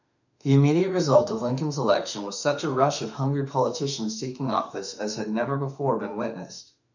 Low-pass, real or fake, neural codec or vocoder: 7.2 kHz; fake; autoencoder, 48 kHz, 32 numbers a frame, DAC-VAE, trained on Japanese speech